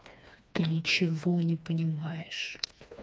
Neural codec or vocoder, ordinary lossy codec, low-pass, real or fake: codec, 16 kHz, 2 kbps, FreqCodec, smaller model; none; none; fake